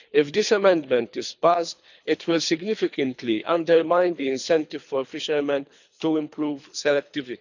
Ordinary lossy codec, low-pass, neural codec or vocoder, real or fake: none; 7.2 kHz; codec, 24 kHz, 3 kbps, HILCodec; fake